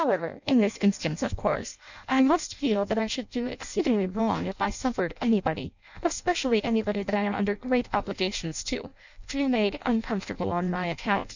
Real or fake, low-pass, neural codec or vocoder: fake; 7.2 kHz; codec, 16 kHz in and 24 kHz out, 0.6 kbps, FireRedTTS-2 codec